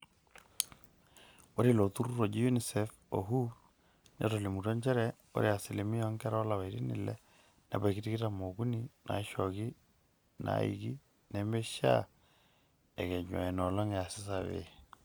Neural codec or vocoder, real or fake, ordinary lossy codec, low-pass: none; real; none; none